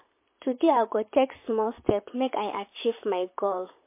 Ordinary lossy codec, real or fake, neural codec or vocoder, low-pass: MP3, 24 kbps; fake; vocoder, 44.1 kHz, 128 mel bands, Pupu-Vocoder; 3.6 kHz